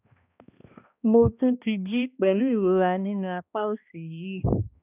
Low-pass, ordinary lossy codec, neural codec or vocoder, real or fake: 3.6 kHz; none; codec, 16 kHz, 1 kbps, X-Codec, HuBERT features, trained on balanced general audio; fake